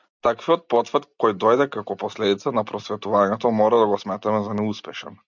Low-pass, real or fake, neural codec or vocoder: 7.2 kHz; real; none